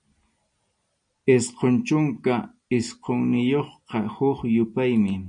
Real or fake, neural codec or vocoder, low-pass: real; none; 9.9 kHz